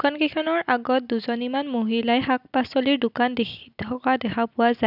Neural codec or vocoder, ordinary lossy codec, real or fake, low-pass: none; none; real; 5.4 kHz